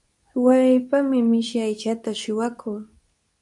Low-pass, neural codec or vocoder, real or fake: 10.8 kHz; codec, 24 kHz, 0.9 kbps, WavTokenizer, medium speech release version 2; fake